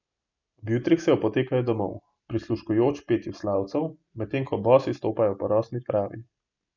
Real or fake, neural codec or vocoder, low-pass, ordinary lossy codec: real; none; 7.2 kHz; none